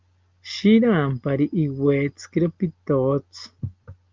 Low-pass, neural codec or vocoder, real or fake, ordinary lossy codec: 7.2 kHz; none; real; Opus, 32 kbps